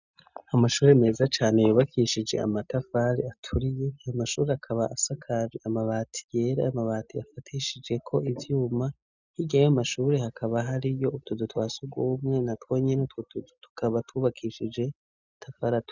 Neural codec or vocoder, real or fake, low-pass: none; real; 7.2 kHz